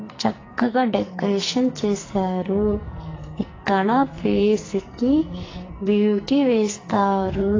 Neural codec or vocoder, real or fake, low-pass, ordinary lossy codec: codec, 44.1 kHz, 2.6 kbps, SNAC; fake; 7.2 kHz; AAC, 32 kbps